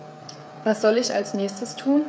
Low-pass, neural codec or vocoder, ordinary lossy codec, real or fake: none; codec, 16 kHz, 16 kbps, FreqCodec, smaller model; none; fake